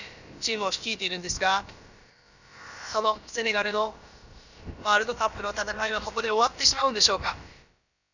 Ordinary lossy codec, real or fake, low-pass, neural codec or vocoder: none; fake; 7.2 kHz; codec, 16 kHz, about 1 kbps, DyCAST, with the encoder's durations